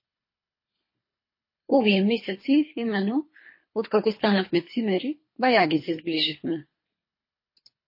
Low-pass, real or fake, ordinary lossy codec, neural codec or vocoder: 5.4 kHz; fake; MP3, 24 kbps; codec, 24 kHz, 3 kbps, HILCodec